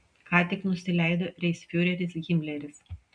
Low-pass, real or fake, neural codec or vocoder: 9.9 kHz; real; none